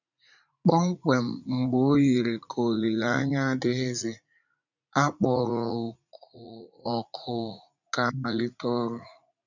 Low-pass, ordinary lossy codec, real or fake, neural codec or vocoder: 7.2 kHz; none; fake; vocoder, 44.1 kHz, 80 mel bands, Vocos